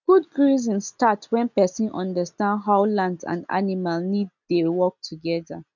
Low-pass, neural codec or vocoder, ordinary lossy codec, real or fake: 7.2 kHz; none; none; real